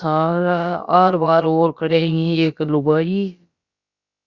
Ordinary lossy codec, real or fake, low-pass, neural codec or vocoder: Opus, 64 kbps; fake; 7.2 kHz; codec, 16 kHz, about 1 kbps, DyCAST, with the encoder's durations